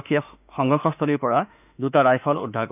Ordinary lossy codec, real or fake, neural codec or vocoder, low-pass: none; fake; autoencoder, 48 kHz, 32 numbers a frame, DAC-VAE, trained on Japanese speech; 3.6 kHz